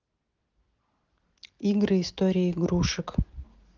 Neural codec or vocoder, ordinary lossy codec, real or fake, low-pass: none; Opus, 16 kbps; real; 7.2 kHz